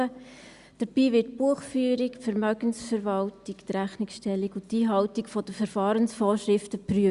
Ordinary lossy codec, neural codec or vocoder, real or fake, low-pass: Opus, 64 kbps; none; real; 10.8 kHz